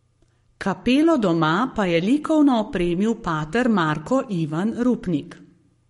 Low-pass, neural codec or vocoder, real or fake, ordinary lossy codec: 19.8 kHz; codec, 44.1 kHz, 7.8 kbps, Pupu-Codec; fake; MP3, 48 kbps